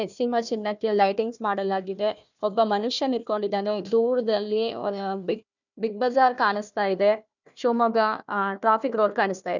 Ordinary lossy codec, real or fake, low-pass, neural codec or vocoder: none; fake; 7.2 kHz; codec, 16 kHz, 1 kbps, FunCodec, trained on Chinese and English, 50 frames a second